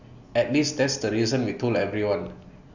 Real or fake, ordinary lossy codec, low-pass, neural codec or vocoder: real; none; 7.2 kHz; none